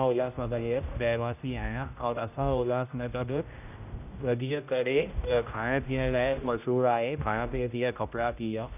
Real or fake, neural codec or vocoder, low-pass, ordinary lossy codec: fake; codec, 16 kHz, 0.5 kbps, X-Codec, HuBERT features, trained on general audio; 3.6 kHz; none